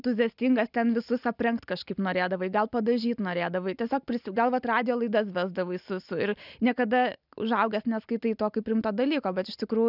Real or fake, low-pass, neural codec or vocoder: real; 5.4 kHz; none